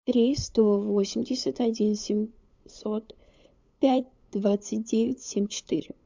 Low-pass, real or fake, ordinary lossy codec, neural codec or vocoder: 7.2 kHz; fake; MP3, 64 kbps; codec, 16 kHz, 8 kbps, FunCodec, trained on LibriTTS, 25 frames a second